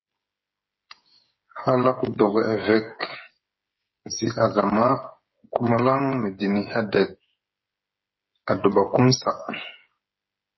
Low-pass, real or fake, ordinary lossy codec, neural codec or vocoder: 7.2 kHz; fake; MP3, 24 kbps; codec, 16 kHz, 8 kbps, FreqCodec, smaller model